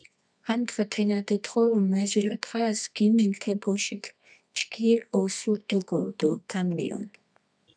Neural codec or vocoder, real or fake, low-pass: codec, 24 kHz, 0.9 kbps, WavTokenizer, medium music audio release; fake; 9.9 kHz